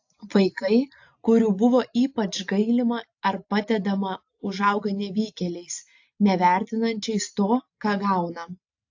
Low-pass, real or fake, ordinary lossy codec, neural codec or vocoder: 7.2 kHz; real; AAC, 48 kbps; none